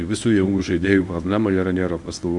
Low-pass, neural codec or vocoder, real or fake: 10.8 kHz; codec, 24 kHz, 0.9 kbps, WavTokenizer, medium speech release version 1; fake